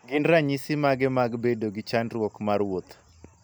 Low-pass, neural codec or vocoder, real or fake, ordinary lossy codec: none; none; real; none